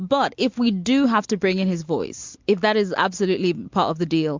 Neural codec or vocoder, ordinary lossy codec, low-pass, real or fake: none; MP3, 64 kbps; 7.2 kHz; real